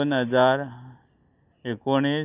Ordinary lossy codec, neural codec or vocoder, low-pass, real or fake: none; none; 3.6 kHz; real